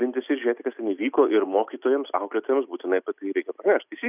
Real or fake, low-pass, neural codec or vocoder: real; 3.6 kHz; none